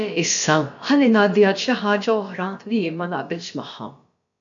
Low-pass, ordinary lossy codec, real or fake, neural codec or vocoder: 7.2 kHz; AAC, 64 kbps; fake; codec, 16 kHz, about 1 kbps, DyCAST, with the encoder's durations